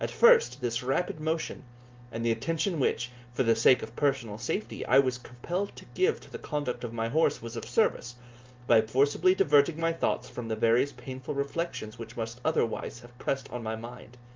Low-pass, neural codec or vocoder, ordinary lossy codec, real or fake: 7.2 kHz; none; Opus, 24 kbps; real